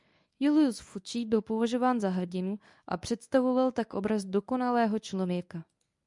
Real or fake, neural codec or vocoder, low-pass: fake; codec, 24 kHz, 0.9 kbps, WavTokenizer, medium speech release version 1; 10.8 kHz